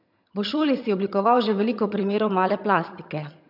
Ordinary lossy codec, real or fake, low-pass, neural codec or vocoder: none; fake; 5.4 kHz; vocoder, 22.05 kHz, 80 mel bands, HiFi-GAN